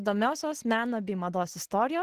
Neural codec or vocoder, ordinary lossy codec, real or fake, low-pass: none; Opus, 16 kbps; real; 14.4 kHz